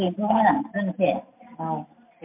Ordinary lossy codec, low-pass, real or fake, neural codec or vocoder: MP3, 32 kbps; 3.6 kHz; real; none